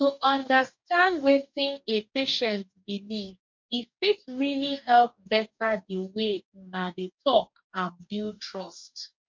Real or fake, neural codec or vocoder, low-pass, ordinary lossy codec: fake; codec, 44.1 kHz, 2.6 kbps, DAC; 7.2 kHz; MP3, 64 kbps